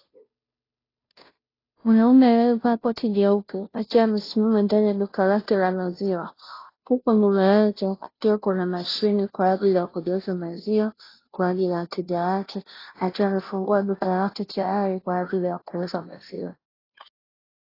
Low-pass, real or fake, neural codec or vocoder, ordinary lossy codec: 5.4 kHz; fake; codec, 16 kHz, 0.5 kbps, FunCodec, trained on Chinese and English, 25 frames a second; AAC, 24 kbps